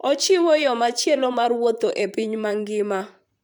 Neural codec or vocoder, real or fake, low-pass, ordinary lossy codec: vocoder, 44.1 kHz, 128 mel bands, Pupu-Vocoder; fake; 19.8 kHz; none